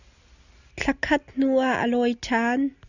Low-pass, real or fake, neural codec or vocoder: 7.2 kHz; real; none